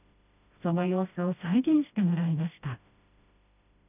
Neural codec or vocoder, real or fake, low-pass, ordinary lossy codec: codec, 16 kHz, 1 kbps, FreqCodec, smaller model; fake; 3.6 kHz; none